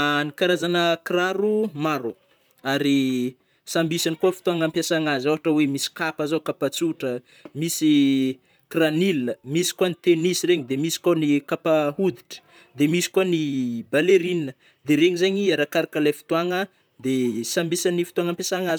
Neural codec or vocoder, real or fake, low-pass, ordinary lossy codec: vocoder, 44.1 kHz, 128 mel bands, Pupu-Vocoder; fake; none; none